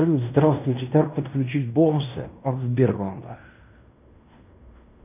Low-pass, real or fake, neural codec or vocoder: 3.6 kHz; fake; codec, 16 kHz in and 24 kHz out, 0.9 kbps, LongCat-Audio-Codec, fine tuned four codebook decoder